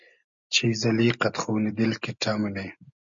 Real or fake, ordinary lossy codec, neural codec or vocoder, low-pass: real; MP3, 64 kbps; none; 7.2 kHz